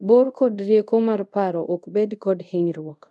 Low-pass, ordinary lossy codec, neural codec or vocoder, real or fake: none; none; codec, 24 kHz, 0.5 kbps, DualCodec; fake